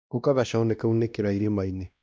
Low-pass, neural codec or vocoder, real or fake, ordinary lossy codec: none; codec, 16 kHz, 1 kbps, X-Codec, WavLM features, trained on Multilingual LibriSpeech; fake; none